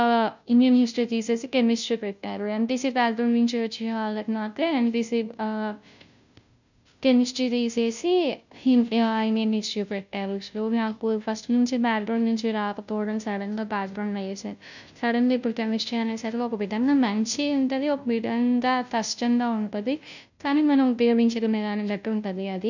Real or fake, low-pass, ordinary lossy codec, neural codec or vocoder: fake; 7.2 kHz; none; codec, 16 kHz, 0.5 kbps, FunCodec, trained on Chinese and English, 25 frames a second